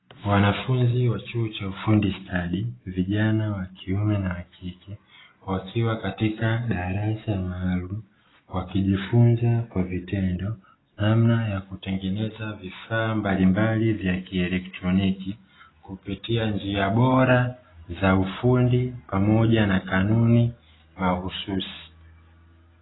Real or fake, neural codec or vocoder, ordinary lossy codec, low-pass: real; none; AAC, 16 kbps; 7.2 kHz